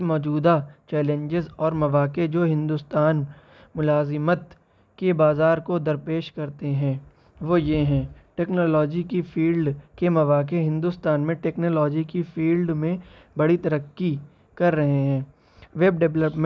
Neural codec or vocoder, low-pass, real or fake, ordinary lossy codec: none; none; real; none